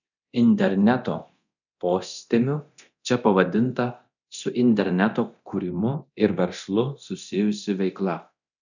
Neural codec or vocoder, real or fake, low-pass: codec, 24 kHz, 0.9 kbps, DualCodec; fake; 7.2 kHz